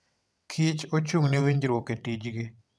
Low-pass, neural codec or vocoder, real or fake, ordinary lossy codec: none; vocoder, 22.05 kHz, 80 mel bands, WaveNeXt; fake; none